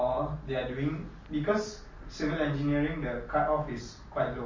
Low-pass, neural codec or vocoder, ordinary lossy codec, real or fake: 7.2 kHz; none; MP3, 32 kbps; real